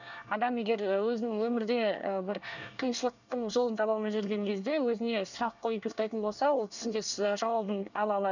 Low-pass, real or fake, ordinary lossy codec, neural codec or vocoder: 7.2 kHz; fake; none; codec, 24 kHz, 1 kbps, SNAC